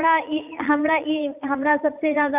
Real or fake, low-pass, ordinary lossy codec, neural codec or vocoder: fake; 3.6 kHz; none; vocoder, 44.1 kHz, 80 mel bands, Vocos